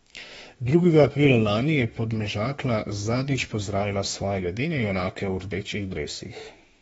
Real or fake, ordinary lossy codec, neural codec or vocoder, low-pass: fake; AAC, 24 kbps; autoencoder, 48 kHz, 32 numbers a frame, DAC-VAE, trained on Japanese speech; 19.8 kHz